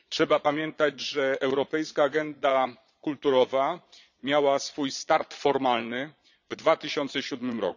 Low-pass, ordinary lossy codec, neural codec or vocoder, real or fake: 7.2 kHz; none; vocoder, 22.05 kHz, 80 mel bands, Vocos; fake